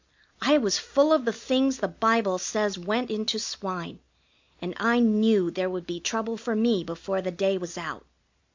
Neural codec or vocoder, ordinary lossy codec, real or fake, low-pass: none; MP3, 64 kbps; real; 7.2 kHz